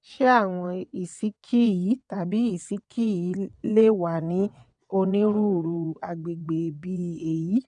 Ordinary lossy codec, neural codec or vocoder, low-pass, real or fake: none; vocoder, 22.05 kHz, 80 mel bands, WaveNeXt; 9.9 kHz; fake